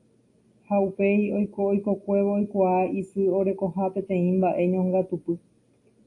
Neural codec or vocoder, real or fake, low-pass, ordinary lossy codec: none; real; 10.8 kHz; AAC, 48 kbps